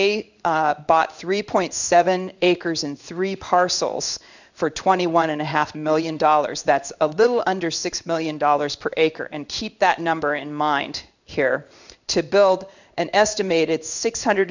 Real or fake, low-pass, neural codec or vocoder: fake; 7.2 kHz; codec, 16 kHz in and 24 kHz out, 1 kbps, XY-Tokenizer